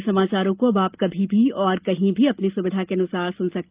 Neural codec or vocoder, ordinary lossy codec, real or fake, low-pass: none; Opus, 32 kbps; real; 3.6 kHz